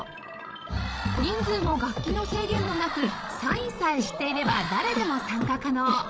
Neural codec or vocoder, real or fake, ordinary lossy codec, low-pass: codec, 16 kHz, 16 kbps, FreqCodec, larger model; fake; none; none